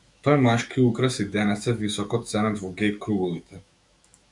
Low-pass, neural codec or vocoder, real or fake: 10.8 kHz; autoencoder, 48 kHz, 128 numbers a frame, DAC-VAE, trained on Japanese speech; fake